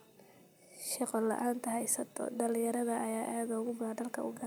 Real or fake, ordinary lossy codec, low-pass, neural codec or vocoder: real; none; none; none